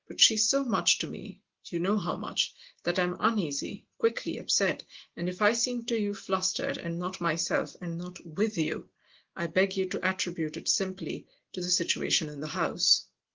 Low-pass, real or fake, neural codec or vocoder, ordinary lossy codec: 7.2 kHz; real; none; Opus, 16 kbps